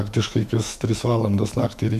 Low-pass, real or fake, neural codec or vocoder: 14.4 kHz; fake; autoencoder, 48 kHz, 128 numbers a frame, DAC-VAE, trained on Japanese speech